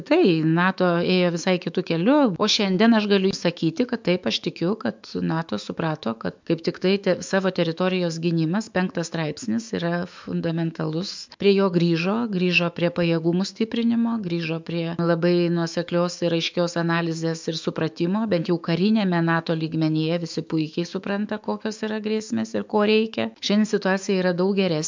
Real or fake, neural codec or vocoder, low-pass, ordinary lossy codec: fake; autoencoder, 48 kHz, 128 numbers a frame, DAC-VAE, trained on Japanese speech; 7.2 kHz; MP3, 64 kbps